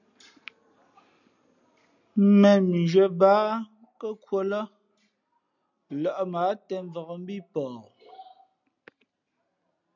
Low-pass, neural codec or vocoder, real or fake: 7.2 kHz; none; real